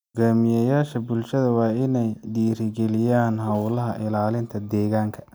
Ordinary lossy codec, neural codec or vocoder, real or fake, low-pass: none; none; real; none